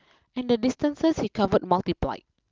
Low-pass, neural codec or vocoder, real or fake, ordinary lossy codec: 7.2 kHz; none; real; Opus, 24 kbps